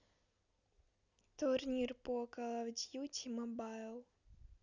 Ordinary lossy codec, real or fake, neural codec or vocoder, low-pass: none; real; none; 7.2 kHz